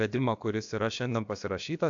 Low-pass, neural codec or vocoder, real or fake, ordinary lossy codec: 7.2 kHz; codec, 16 kHz, about 1 kbps, DyCAST, with the encoder's durations; fake; AAC, 64 kbps